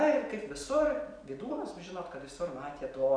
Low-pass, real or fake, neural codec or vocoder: 9.9 kHz; real; none